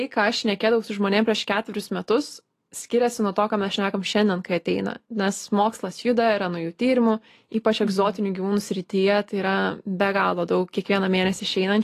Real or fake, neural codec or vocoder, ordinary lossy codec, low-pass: real; none; AAC, 48 kbps; 14.4 kHz